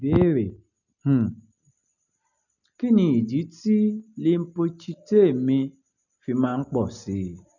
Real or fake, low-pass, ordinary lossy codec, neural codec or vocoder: real; 7.2 kHz; none; none